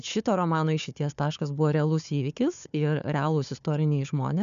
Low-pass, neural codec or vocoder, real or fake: 7.2 kHz; codec, 16 kHz, 4 kbps, FunCodec, trained on Chinese and English, 50 frames a second; fake